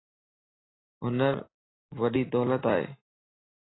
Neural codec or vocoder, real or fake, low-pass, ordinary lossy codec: none; real; 7.2 kHz; AAC, 16 kbps